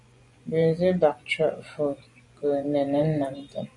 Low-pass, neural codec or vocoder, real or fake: 10.8 kHz; vocoder, 24 kHz, 100 mel bands, Vocos; fake